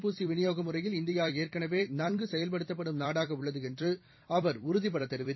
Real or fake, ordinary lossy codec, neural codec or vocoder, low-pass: fake; MP3, 24 kbps; vocoder, 44.1 kHz, 128 mel bands every 256 samples, BigVGAN v2; 7.2 kHz